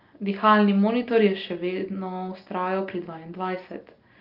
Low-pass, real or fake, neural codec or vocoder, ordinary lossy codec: 5.4 kHz; real; none; Opus, 32 kbps